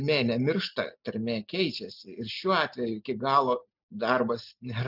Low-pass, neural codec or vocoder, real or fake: 5.4 kHz; none; real